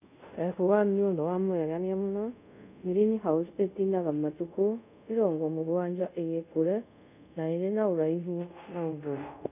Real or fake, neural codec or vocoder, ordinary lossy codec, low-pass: fake; codec, 24 kHz, 0.5 kbps, DualCodec; none; 3.6 kHz